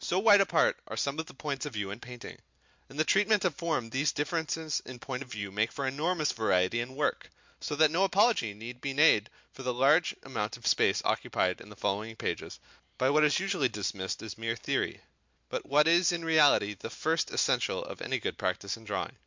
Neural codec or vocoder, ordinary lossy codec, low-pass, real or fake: none; MP3, 64 kbps; 7.2 kHz; real